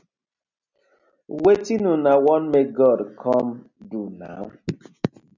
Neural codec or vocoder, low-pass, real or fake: none; 7.2 kHz; real